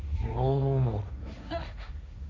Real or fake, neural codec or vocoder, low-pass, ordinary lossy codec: fake; codec, 16 kHz, 1.1 kbps, Voila-Tokenizer; none; none